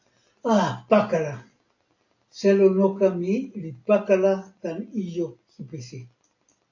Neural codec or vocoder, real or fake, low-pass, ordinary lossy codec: none; real; 7.2 kHz; AAC, 48 kbps